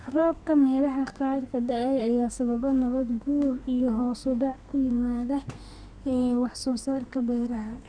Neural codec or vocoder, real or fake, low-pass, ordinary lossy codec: codec, 32 kHz, 1.9 kbps, SNAC; fake; 9.9 kHz; none